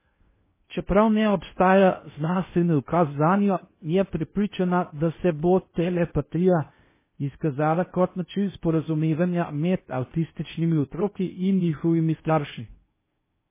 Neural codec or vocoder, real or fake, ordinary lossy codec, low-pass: codec, 16 kHz in and 24 kHz out, 0.6 kbps, FocalCodec, streaming, 2048 codes; fake; MP3, 16 kbps; 3.6 kHz